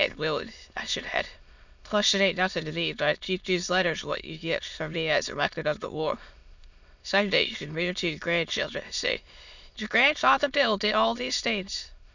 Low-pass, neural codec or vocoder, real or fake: 7.2 kHz; autoencoder, 22.05 kHz, a latent of 192 numbers a frame, VITS, trained on many speakers; fake